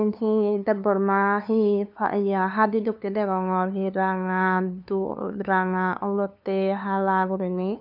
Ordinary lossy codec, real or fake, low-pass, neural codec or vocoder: none; fake; 5.4 kHz; codec, 16 kHz, 2 kbps, FunCodec, trained on LibriTTS, 25 frames a second